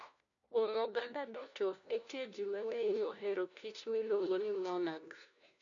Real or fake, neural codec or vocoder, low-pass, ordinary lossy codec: fake; codec, 16 kHz, 1 kbps, FunCodec, trained on LibriTTS, 50 frames a second; 7.2 kHz; MP3, 96 kbps